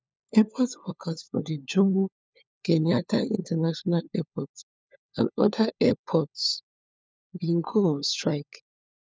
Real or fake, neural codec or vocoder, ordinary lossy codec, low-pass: fake; codec, 16 kHz, 4 kbps, FunCodec, trained on LibriTTS, 50 frames a second; none; none